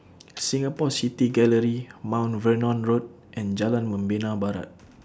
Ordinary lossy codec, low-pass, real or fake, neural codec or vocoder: none; none; real; none